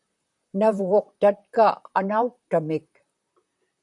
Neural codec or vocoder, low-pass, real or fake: vocoder, 44.1 kHz, 128 mel bands, Pupu-Vocoder; 10.8 kHz; fake